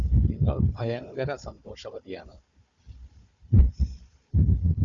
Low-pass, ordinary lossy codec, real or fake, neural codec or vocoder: 7.2 kHz; Opus, 64 kbps; fake; codec, 16 kHz, 4 kbps, FunCodec, trained on Chinese and English, 50 frames a second